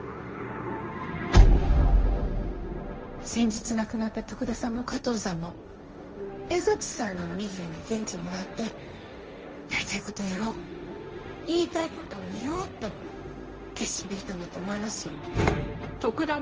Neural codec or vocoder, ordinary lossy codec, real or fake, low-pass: codec, 16 kHz, 1.1 kbps, Voila-Tokenizer; Opus, 24 kbps; fake; 7.2 kHz